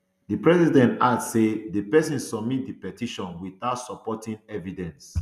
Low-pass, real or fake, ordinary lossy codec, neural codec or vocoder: 14.4 kHz; real; none; none